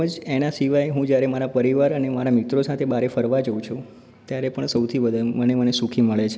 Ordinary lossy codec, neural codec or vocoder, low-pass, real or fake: none; none; none; real